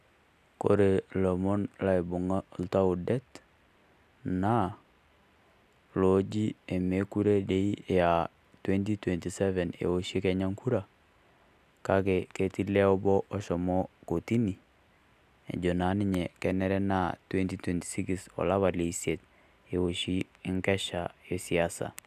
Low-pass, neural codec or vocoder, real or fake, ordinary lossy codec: 14.4 kHz; none; real; none